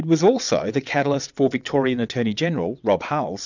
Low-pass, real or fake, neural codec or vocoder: 7.2 kHz; fake; vocoder, 22.05 kHz, 80 mel bands, WaveNeXt